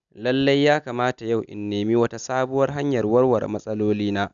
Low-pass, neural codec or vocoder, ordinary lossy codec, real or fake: 7.2 kHz; none; none; real